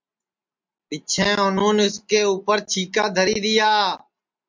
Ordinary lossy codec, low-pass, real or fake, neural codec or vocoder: MP3, 64 kbps; 7.2 kHz; real; none